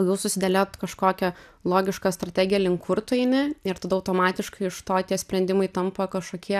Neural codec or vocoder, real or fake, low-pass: none; real; 14.4 kHz